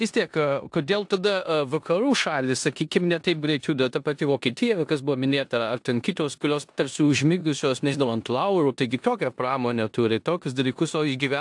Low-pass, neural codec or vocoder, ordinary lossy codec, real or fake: 10.8 kHz; codec, 16 kHz in and 24 kHz out, 0.9 kbps, LongCat-Audio-Codec, four codebook decoder; AAC, 64 kbps; fake